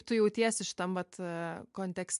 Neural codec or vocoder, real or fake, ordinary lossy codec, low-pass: none; real; MP3, 64 kbps; 10.8 kHz